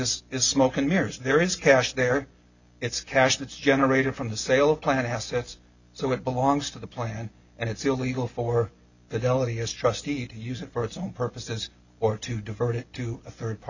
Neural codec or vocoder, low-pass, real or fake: none; 7.2 kHz; real